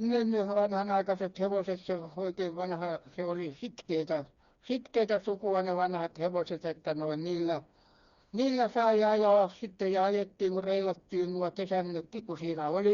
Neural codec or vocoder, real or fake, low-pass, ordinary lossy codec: codec, 16 kHz, 2 kbps, FreqCodec, smaller model; fake; 7.2 kHz; Opus, 64 kbps